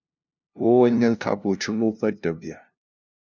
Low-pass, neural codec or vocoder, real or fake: 7.2 kHz; codec, 16 kHz, 0.5 kbps, FunCodec, trained on LibriTTS, 25 frames a second; fake